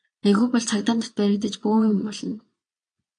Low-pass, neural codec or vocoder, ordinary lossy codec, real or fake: 9.9 kHz; vocoder, 22.05 kHz, 80 mel bands, Vocos; MP3, 96 kbps; fake